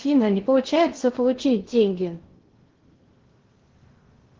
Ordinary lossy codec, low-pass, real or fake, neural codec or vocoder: Opus, 16 kbps; 7.2 kHz; fake; codec, 16 kHz in and 24 kHz out, 0.6 kbps, FocalCodec, streaming, 2048 codes